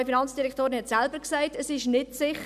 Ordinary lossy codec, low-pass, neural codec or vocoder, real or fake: none; 14.4 kHz; none; real